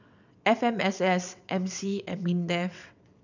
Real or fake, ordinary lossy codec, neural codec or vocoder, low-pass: fake; none; vocoder, 22.05 kHz, 80 mel bands, WaveNeXt; 7.2 kHz